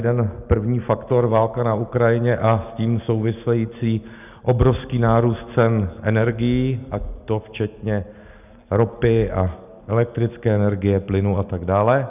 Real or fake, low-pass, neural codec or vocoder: real; 3.6 kHz; none